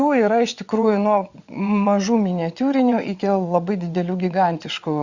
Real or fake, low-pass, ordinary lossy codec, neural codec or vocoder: fake; 7.2 kHz; Opus, 64 kbps; vocoder, 22.05 kHz, 80 mel bands, WaveNeXt